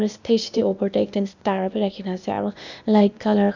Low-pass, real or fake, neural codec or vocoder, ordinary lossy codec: 7.2 kHz; fake; codec, 16 kHz, 0.8 kbps, ZipCodec; none